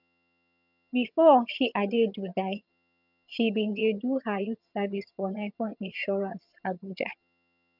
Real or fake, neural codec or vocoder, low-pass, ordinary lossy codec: fake; vocoder, 22.05 kHz, 80 mel bands, HiFi-GAN; 5.4 kHz; none